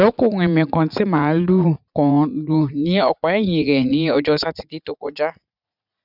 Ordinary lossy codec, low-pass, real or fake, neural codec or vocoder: none; 5.4 kHz; fake; vocoder, 44.1 kHz, 80 mel bands, Vocos